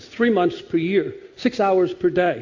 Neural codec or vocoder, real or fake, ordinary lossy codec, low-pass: none; real; AAC, 48 kbps; 7.2 kHz